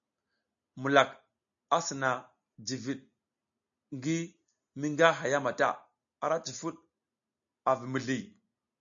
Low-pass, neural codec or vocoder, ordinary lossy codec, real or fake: 7.2 kHz; none; AAC, 64 kbps; real